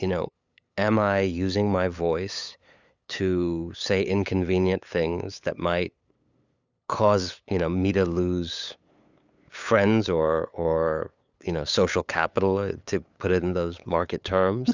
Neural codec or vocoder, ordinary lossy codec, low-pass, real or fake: codec, 16 kHz, 8 kbps, FunCodec, trained on LibriTTS, 25 frames a second; Opus, 64 kbps; 7.2 kHz; fake